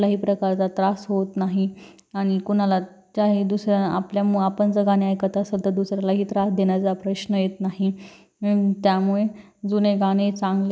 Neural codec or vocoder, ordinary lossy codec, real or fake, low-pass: none; none; real; none